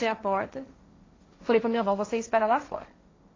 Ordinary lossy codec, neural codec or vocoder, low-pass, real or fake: AAC, 32 kbps; codec, 16 kHz, 1.1 kbps, Voila-Tokenizer; 7.2 kHz; fake